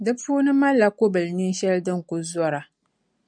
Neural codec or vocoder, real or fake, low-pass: none; real; 9.9 kHz